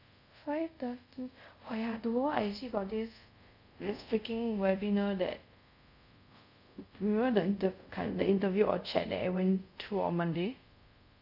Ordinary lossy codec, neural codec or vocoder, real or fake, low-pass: none; codec, 24 kHz, 0.5 kbps, DualCodec; fake; 5.4 kHz